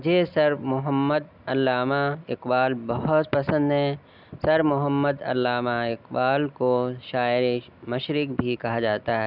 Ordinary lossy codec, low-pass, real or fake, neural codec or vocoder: none; 5.4 kHz; real; none